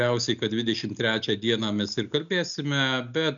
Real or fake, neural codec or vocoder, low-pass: real; none; 7.2 kHz